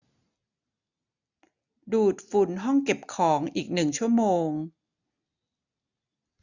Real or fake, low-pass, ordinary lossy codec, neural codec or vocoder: real; 7.2 kHz; none; none